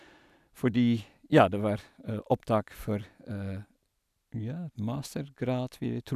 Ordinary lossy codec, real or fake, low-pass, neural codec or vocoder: none; real; 14.4 kHz; none